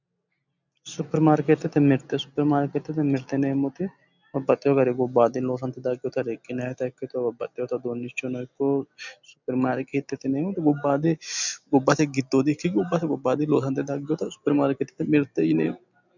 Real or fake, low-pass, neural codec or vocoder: real; 7.2 kHz; none